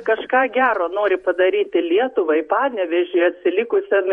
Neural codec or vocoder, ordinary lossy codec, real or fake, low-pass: vocoder, 48 kHz, 128 mel bands, Vocos; MP3, 48 kbps; fake; 19.8 kHz